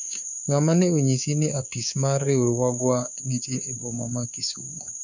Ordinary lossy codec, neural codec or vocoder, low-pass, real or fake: none; codec, 24 kHz, 3.1 kbps, DualCodec; 7.2 kHz; fake